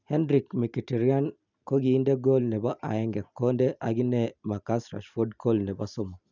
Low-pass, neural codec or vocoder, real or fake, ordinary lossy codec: 7.2 kHz; none; real; none